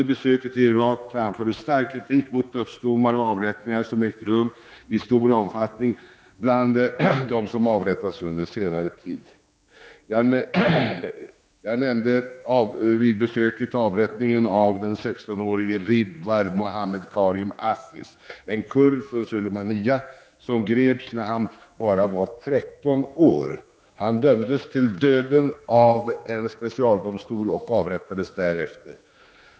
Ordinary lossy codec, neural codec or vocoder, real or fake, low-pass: none; codec, 16 kHz, 2 kbps, X-Codec, HuBERT features, trained on general audio; fake; none